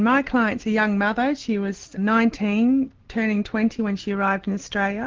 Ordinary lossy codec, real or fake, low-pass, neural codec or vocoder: Opus, 16 kbps; real; 7.2 kHz; none